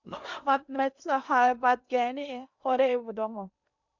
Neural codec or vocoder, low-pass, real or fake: codec, 16 kHz in and 24 kHz out, 0.8 kbps, FocalCodec, streaming, 65536 codes; 7.2 kHz; fake